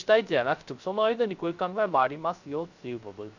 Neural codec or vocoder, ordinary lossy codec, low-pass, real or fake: codec, 16 kHz, 0.3 kbps, FocalCodec; none; 7.2 kHz; fake